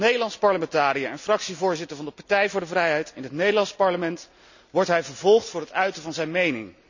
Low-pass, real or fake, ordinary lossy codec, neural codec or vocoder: 7.2 kHz; real; none; none